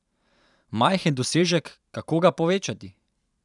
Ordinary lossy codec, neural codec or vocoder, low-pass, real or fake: none; vocoder, 44.1 kHz, 128 mel bands every 512 samples, BigVGAN v2; 10.8 kHz; fake